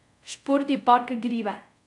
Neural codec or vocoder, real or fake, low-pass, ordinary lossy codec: codec, 24 kHz, 0.5 kbps, DualCodec; fake; 10.8 kHz; none